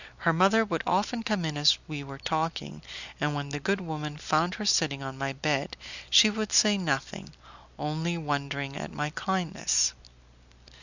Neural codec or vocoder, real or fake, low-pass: none; real; 7.2 kHz